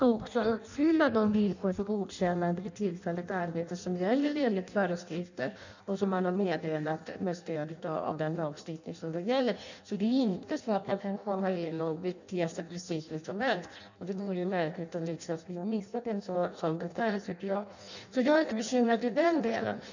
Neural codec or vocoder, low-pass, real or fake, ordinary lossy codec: codec, 16 kHz in and 24 kHz out, 0.6 kbps, FireRedTTS-2 codec; 7.2 kHz; fake; none